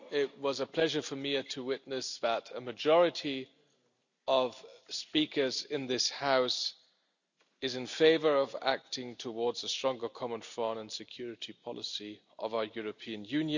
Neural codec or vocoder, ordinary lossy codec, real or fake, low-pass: none; none; real; 7.2 kHz